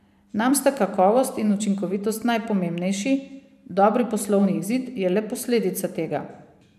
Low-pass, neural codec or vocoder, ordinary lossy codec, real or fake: 14.4 kHz; vocoder, 44.1 kHz, 128 mel bands every 512 samples, BigVGAN v2; none; fake